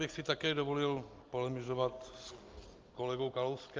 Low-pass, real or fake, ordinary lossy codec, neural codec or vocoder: 7.2 kHz; real; Opus, 24 kbps; none